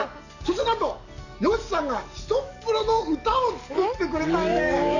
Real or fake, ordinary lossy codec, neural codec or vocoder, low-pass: fake; none; codec, 44.1 kHz, 7.8 kbps, DAC; 7.2 kHz